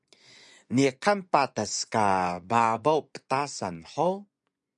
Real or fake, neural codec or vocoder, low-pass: fake; vocoder, 24 kHz, 100 mel bands, Vocos; 10.8 kHz